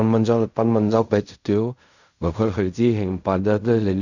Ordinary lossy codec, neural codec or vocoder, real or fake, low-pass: none; codec, 16 kHz in and 24 kHz out, 0.4 kbps, LongCat-Audio-Codec, fine tuned four codebook decoder; fake; 7.2 kHz